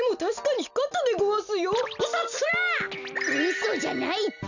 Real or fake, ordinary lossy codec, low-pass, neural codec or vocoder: real; none; 7.2 kHz; none